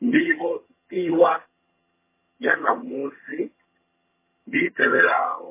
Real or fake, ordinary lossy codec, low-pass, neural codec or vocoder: fake; MP3, 16 kbps; 3.6 kHz; vocoder, 22.05 kHz, 80 mel bands, HiFi-GAN